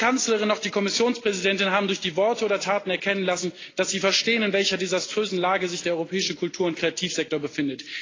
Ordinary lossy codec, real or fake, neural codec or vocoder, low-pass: AAC, 32 kbps; real; none; 7.2 kHz